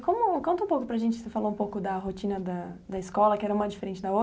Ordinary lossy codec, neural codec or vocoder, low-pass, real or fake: none; none; none; real